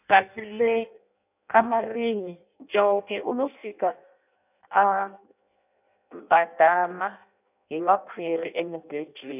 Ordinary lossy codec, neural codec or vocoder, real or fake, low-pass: none; codec, 16 kHz in and 24 kHz out, 0.6 kbps, FireRedTTS-2 codec; fake; 3.6 kHz